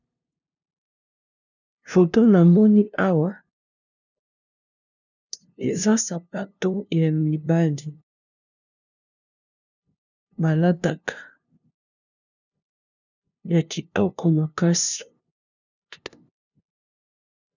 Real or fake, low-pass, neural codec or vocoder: fake; 7.2 kHz; codec, 16 kHz, 0.5 kbps, FunCodec, trained on LibriTTS, 25 frames a second